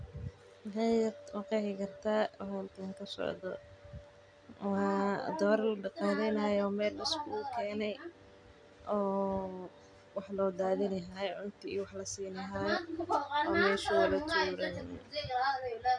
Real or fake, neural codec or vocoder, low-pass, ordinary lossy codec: real; none; 9.9 kHz; none